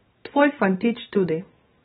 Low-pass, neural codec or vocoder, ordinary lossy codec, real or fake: 19.8 kHz; vocoder, 44.1 kHz, 128 mel bands every 512 samples, BigVGAN v2; AAC, 16 kbps; fake